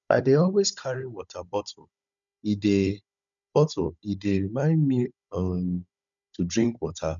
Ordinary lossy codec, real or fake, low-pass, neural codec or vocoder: none; fake; 7.2 kHz; codec, 16 kHz, 16 kbps, FunCodec, trained on Chinese and English, 50 frames a second